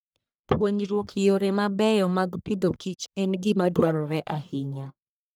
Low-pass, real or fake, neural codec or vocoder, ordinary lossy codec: none; fake; codec, 44.1 kHz, 1.7 kbps, Pupu-Codec; none